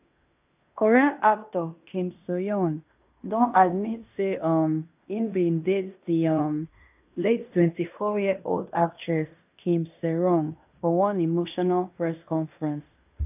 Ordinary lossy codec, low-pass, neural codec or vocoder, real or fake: AAC, 32 kbps; 3.6 kHz; codec, 16 kHz in and 24 kHz out, 0.9 kbps, LongCat-Audio-Codec, fine tuned four codebook decoder; fake